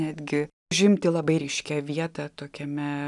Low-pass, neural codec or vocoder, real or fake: 10.8 kHz; none; real